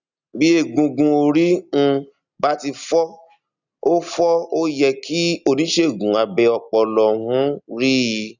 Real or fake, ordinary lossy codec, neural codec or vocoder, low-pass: real; none; none; 7.2 kHz